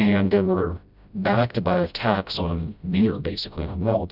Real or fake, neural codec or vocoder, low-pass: fake; codec, 16 kHz, 0.5 kbps, FreqCodec, smaller model; 5.4 kHz